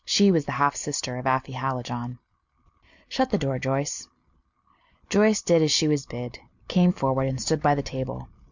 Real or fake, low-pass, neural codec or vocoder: real; 7.2 kHz; none